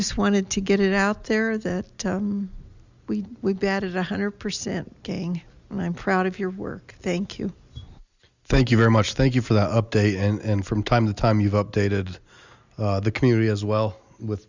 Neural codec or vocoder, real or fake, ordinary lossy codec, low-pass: none; real; Opus, 64 kbps; 7.2 kHz